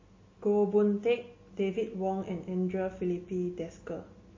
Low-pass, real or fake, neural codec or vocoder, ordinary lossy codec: 7.2 kHz; real; none; MP3, 32 kbps